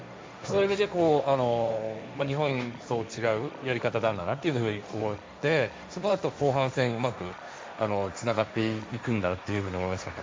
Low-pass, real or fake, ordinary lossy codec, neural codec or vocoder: none; fake; none; codec, 16 kHz, 1.1 kbps, Voila-Tokenizer